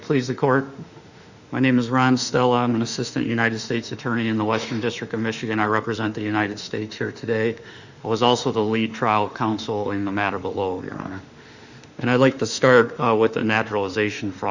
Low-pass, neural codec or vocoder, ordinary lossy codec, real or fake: 7.2 kHz; autoencoder, 48 kHz, 32 numbers a frame, DAC-VAE, trained on Japanese speech; Opus, 64 kbps; fake